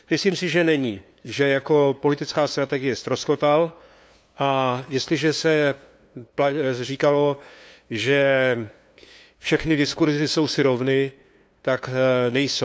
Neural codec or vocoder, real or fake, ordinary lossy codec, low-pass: codec, 16 kHz, 2 kbps, FunCodec, trained on LibriTTS, 25 frames a second; fake; none; none